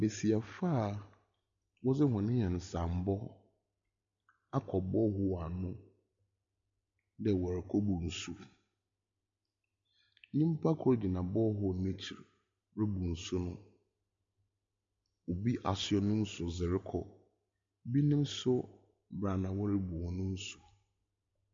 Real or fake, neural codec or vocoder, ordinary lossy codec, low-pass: real; none; MP3, 48 kbps; 7.2 kHz